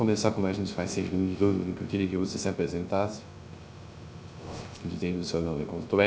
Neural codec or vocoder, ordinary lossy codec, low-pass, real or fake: codec, 16 kHz, 0.3 kbps, FocalCodec; none; none; fake